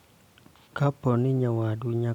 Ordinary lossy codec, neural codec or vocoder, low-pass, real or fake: none; none; 19.8 kHz; real